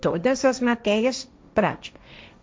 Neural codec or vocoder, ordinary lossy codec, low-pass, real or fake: codec, 16 kHz, 1.1 kbps, Voila-Tokenizer; none; none; fake